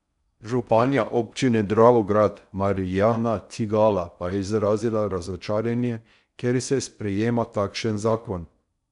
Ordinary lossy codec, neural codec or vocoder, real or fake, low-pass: none; codec, 16 kHz in and 24 kHz out, 0.6 kbps, FocalCodec, streaming, 4096 codes; fake; 10.8 kHz